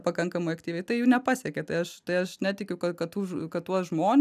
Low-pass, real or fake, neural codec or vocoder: 14.4 kHz; real; none